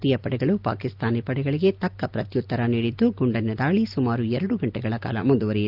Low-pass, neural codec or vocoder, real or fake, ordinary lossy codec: 5.4 kHz; none; real; Opus, 24 kbps